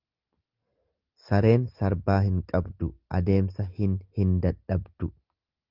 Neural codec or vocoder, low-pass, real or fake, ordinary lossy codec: none; 5.4 kHz; real; Opus, 32 kbps